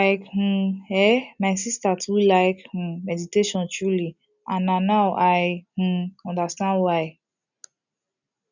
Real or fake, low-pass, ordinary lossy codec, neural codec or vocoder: real; 7.2 kHz; none; none